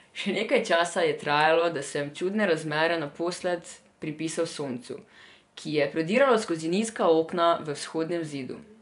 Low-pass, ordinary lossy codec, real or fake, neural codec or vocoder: 10.8 kHz; none; fake; vocoder, 24 kHz, 100 mel bands, Vocos